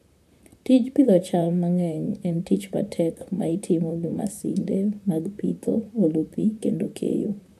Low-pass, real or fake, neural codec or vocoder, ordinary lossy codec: 14.4 kHz; fake; vocoder, 44.1 kHz, 128 mel bands, Pupu-Vocoder; none